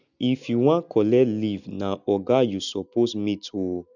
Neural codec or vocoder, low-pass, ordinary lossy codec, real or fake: none; 7.2 kHz; none; real